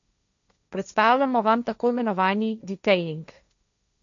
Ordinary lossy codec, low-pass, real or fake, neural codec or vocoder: none; 7.2 kHz; fake; codec, 16 kHz, 1.1 kbps, Voila-Tokenizer